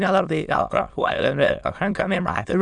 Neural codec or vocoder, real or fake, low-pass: autoencoder, 22.05 kHz, a latent of 192 numbers a frame, VITS, trained on many speakers; fake; 9.9 kHz